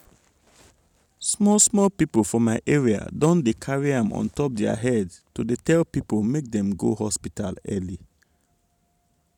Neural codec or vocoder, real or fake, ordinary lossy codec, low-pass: none; real; none; 19.8 kHz